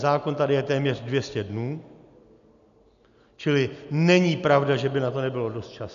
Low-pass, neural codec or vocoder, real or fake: 7.2 kHz; none; real